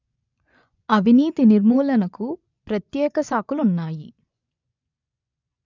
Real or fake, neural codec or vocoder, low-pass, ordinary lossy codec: fake; vocoder, 24 kHz, 100 mel bands, Vocos; 7.2 kHz; none